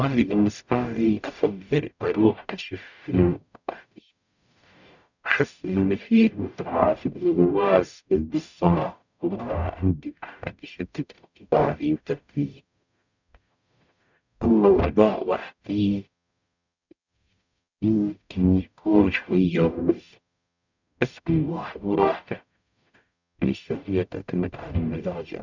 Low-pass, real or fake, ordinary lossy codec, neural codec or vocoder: 7.2 kHz; fake; none; codec, 44.1 kHz, 0.9 kbps, DAC